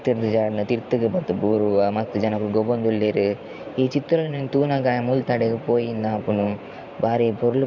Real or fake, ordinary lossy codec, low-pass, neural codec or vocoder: fake; MP3, 64 kbps; 7.2 kHz; vocoder, 44.1 kHz, 128 mel bands every 512 samples, BigVGAN v2